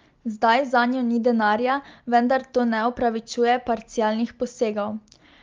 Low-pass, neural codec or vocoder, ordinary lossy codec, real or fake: 7.2 kHz; none; Opus, 32 kbps; real